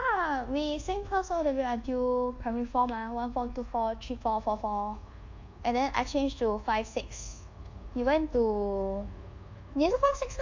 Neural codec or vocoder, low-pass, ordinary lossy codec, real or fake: codec, 24 kHz, 1.2 kbps, DualCodec; 7.2 kHz; AAC, 48 kbps; fake